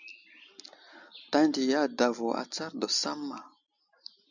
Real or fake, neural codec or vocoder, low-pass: real; none; 7.2 kHz